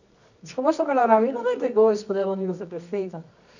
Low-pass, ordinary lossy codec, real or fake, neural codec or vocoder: 7.2 kHz; none; fake; codec, 24 kHz, 0.9 kbps, WavTokenizer, medium music audio release